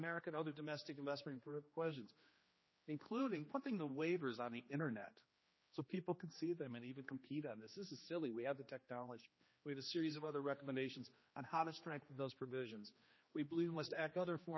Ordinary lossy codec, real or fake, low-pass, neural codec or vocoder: MP3, 24 kbps; fake; 7.2 kHz; codec, 16 kHz, 2 kbps, X-Codec, HuBERT features, trained on general audio